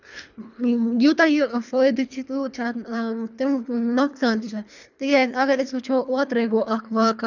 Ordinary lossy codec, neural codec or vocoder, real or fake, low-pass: none; codec, 24 kHz, 3 kbps, HILCodec; fake; 7.2 kHz